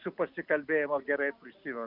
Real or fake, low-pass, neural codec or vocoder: real; 5.4 kHz; none